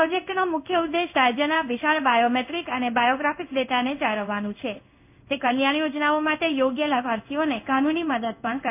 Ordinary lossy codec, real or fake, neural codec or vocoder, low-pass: AAC, 32 kbps; fake; codec, 16 kHz in and 24 kHz out, 1 kbps, XY-Tokenizer; 3.6 kHz